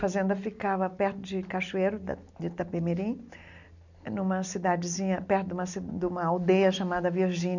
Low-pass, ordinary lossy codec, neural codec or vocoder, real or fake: 7.2 kHz; none; none; real